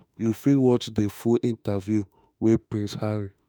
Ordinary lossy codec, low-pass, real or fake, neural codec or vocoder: none; none; fake; autoencoder, 48 kHz, 32 numbers a frame, DAC-VAE, trained on Japanese speech